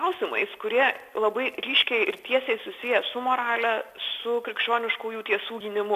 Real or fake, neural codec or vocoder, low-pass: real; none; 14.4 kHz